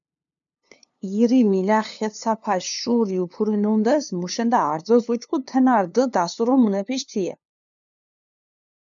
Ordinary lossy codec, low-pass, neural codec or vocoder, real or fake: MP3, 96 kbps; 7.2 kHz; codec, 16 kHz, 2 kbps, FunCodec, trained on LibriTTS, 25 frames a second; fake